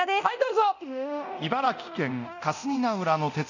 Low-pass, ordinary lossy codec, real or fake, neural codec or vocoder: 7.2 kHz; none; fake; codec, 24 kHz, 0.9 kbps, DualCodec